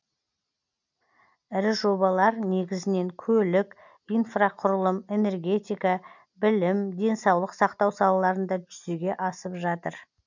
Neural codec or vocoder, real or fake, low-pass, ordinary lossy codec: none; real; 7.2 kHz; none